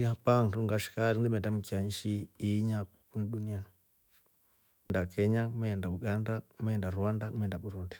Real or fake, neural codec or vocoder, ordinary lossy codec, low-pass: fake; autoencoder, 48 kHz, 128 numbers a frame, DAC-VAE, trained on Japanese speech; none; none